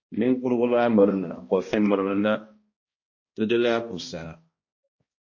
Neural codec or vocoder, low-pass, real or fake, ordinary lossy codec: codec, 16 kHz, 1 kbps, X-Codec, HuBERT features, trained on balanced general audio; 7.2 kHz; fake; MP3, 32 kbps